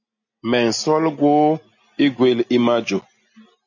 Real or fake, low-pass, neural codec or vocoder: real; 7.2 kHz; none